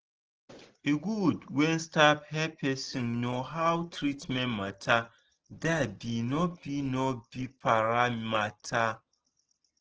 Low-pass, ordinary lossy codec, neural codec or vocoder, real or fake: 7.2 kHz; Opus, 16 kbps; none; real